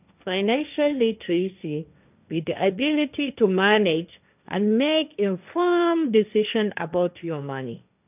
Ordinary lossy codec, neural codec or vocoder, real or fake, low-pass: none; codec, 16 kHz, 1.1 kbps, Voila-Tokenizer; fake; 3.6 kHz